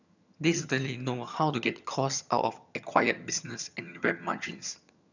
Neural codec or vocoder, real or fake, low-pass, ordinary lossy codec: vocoder, 22.05 kHz, 80 mel bands, HiFi-GAN; fake; 7.2 kHz; none